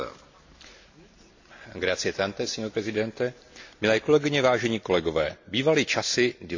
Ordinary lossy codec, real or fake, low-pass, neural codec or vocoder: AAC, 48 kbps; real; 7.2 kHz; none